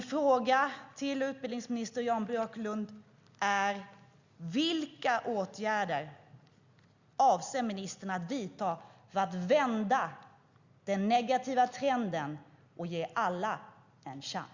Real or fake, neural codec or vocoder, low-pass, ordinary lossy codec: real; none; 7.2 kHz; Opus, 64 kbps